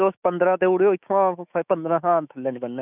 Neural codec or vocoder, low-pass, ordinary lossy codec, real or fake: autoencoder, 48 kHz, 128 numbers a frame, DAC-VAE, trained on Japanese speech; 3.6 kHz; none; fake